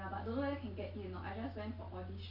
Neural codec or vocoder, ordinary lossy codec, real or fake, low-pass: none; none; real; 5.4 kHz